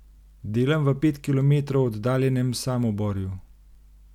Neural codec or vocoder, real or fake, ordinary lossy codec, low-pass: none; real; MP3, 96 kbps; 19.8 kHz